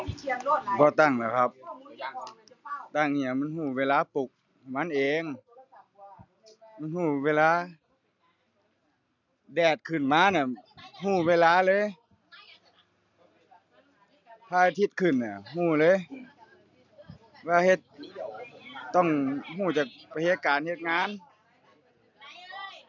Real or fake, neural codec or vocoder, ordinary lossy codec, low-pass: real; none; none; 7.2 kHz